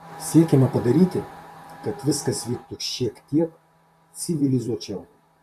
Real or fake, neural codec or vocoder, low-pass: fake; vocoder, 44.1 kHz, 128 mel bands, Pupu-Vocoder; 14.4 kHz